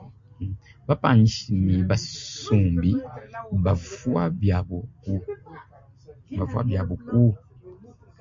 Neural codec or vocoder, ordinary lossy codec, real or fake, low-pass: none; MP3, 48 kbps; real; 7.2 kHz